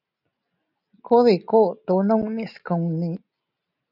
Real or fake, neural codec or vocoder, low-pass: real; none; 5.4 kHz